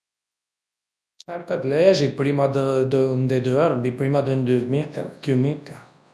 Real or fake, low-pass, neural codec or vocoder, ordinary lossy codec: fake; none; codec, 24 kHz, 0.9 kbps, WavTokenizer, large speech release; none